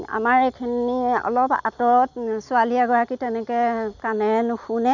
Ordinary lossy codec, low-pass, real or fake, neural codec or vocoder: none; 7.2 kHz; real; none